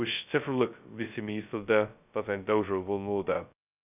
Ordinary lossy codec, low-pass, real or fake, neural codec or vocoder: none; 3.6 kHz; fake; codec, 16 kHz, 0.2 kbps, FocalCodec